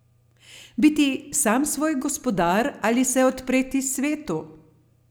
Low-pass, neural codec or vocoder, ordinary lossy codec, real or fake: none; none; none; real